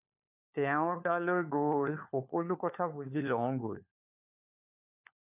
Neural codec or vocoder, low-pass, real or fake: codec, 16 kHz, 4 kbps, FunCodec, trained on LibriTTS, 50 frames a second; 3.6 kHz; fake